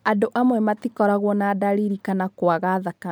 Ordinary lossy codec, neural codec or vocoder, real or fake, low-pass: none; none; real; none